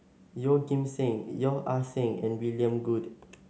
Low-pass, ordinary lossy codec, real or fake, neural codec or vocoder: none; none; real; none